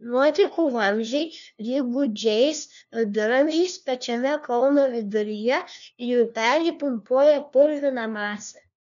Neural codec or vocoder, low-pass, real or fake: codec, 16 kHz, 1 kbps, FunCodec, trained on LibriTTS, 50 frames a second; 7.2 kHz; fake